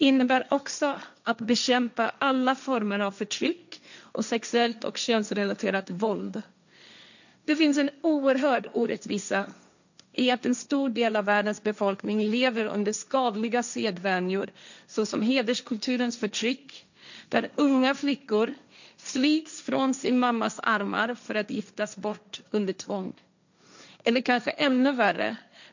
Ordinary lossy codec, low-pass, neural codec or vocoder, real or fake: none; 7.2 kHz; codec, 16 kHz, 1.1 kbps, Voila-Tokenizer; fake